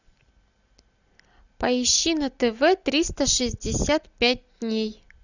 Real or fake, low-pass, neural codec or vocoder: real; 7.2 kHz; none